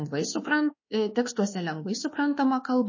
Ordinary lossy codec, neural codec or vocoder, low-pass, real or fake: MP3, 32 kbps; codec, 24 kHz, 1.2 kbps, DualCodec; 7.2 kHz; fake